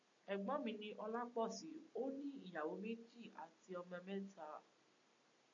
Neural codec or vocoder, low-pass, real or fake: none; 7.2 kHz; real